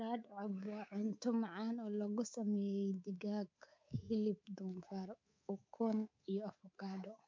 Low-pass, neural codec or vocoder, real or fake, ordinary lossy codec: 7.2 kHz; codec, 24 kHz, 3.1 kbps, DualCodec; fake; MP3, 48 kbps